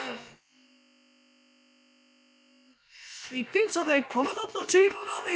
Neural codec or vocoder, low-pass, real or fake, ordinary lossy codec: codec, 16 kHz, about 1 kbps, DyCAST, with the encoder's durations; none; fake; none